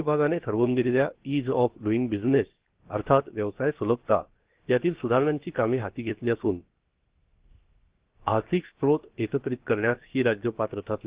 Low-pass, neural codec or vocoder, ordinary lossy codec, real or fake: 3.6 kHz; codec, 16 kHz, 0.7 kbps, FocalCodec; Opus, 16 kbps; fake